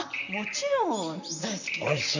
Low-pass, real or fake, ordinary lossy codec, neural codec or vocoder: 7.2 kHz; fake; none; vocoder, 44.1 kHz, 128 mel bands, Pupu-Vocoder